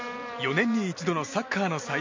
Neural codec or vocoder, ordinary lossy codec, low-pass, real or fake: none; MP3, 64 kbps; 7.2 kHz; real